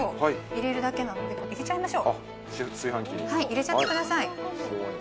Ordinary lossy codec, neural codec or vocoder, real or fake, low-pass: none; none; real; none